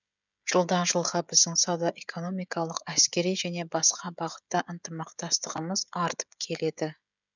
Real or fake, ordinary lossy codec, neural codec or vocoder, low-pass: fake; none; codec, 16 kHz, 16 kbps, FreqCodec, smaller model; 7.2 kHz